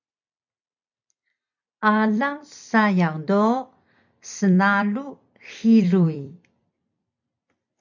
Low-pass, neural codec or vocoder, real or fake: 7.2 kHz; vocoder, 44.1 kHz, 128 mel bands every 256 samples, BigVGAN v2; fake